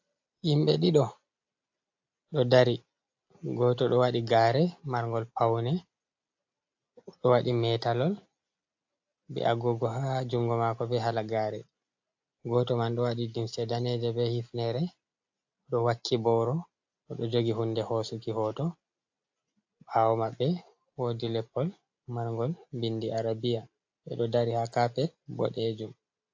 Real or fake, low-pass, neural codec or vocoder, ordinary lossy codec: real; 7.2 kHz; none; AAC, 48 kbps